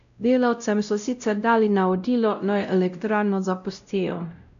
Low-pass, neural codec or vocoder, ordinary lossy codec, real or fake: 7.2 kHz; codec, 16 kHz, 0.5 kbps, X-Codec, WavLM features, trained on Multilingual LibriSpeech; AAC, 96 kbps; fake